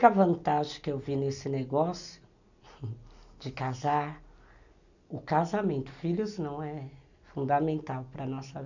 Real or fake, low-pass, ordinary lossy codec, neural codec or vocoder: real; 7.2 kHz; none; none